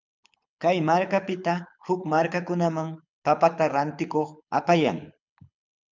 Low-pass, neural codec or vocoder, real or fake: 7.2 kHz; codec, 24 kHz, 6 kbps, HILCodec; fake